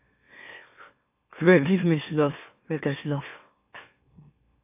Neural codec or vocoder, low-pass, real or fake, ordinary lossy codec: autoencoder, 44.1 kHz, a latent of 192 numbers a frame, MeloTTS; 3.6 kHz; fake; AAC, 32 kbps